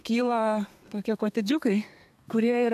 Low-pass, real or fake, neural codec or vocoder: 14.4 kHz; fake; codec, 32 kHz, 1.9 kbps, SNAC